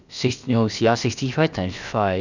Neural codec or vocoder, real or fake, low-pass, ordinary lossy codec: codec, 16 kHz, about 1 kbps, DyCAST, with the encoder's durations; fake; 7.2 kHz; none